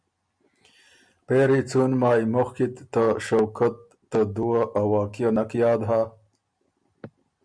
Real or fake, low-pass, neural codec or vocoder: real; 9.9 kHz; none